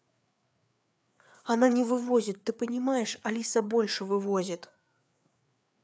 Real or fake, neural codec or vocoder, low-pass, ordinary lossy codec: fake; codec, 16 kHz, 4 kbps, FreqCodec, larger model; none; none